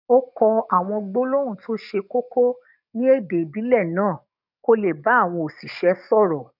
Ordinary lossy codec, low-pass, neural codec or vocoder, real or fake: none; 5.4 kHz; codec, 44.1 kHz, 7.8 kbps, DAC; fake